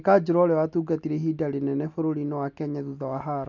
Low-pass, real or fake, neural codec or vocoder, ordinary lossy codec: 7.2 kHz; real; none; none